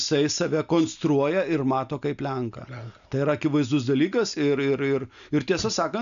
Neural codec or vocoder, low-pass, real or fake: none; 7.2 kHz; real